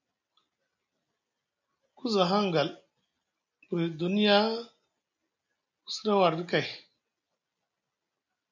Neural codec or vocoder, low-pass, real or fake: none; 7.2 kHz; real